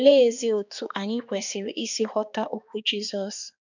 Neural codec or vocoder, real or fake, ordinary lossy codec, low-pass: codec, 16 kHz, 2 kbps, X-Codec, HuBERT features, trained on balanced general audio; fake; none; 7.2 kHz